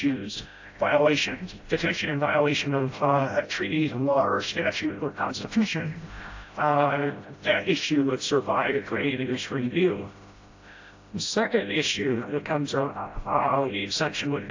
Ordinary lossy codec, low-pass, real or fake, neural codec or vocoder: AAC, 48 kbps; 7.2 kHz; fake; codec, 16 kHz, 0.5 kbps, FreqCodec, smaller model